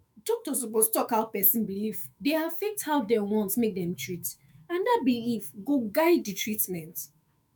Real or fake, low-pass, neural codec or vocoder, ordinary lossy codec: fake; none; autoencoder, 48 kHz, 128 numbers a frame, DAC-VAE, trained on Japanese speech; none